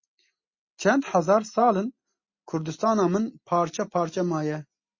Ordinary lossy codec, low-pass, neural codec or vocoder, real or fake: MP3, 32 kbps; 7.2 kHz; none; real